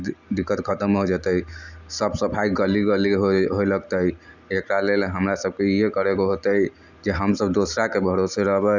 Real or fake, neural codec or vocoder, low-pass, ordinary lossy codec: real; none; 7.2 kHz; none